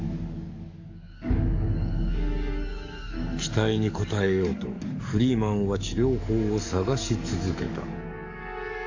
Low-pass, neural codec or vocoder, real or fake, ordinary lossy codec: 7.2 kHz; codec, 44.1 kHz, 7.8 kbps, DAC; fake; AAC, 48 kbps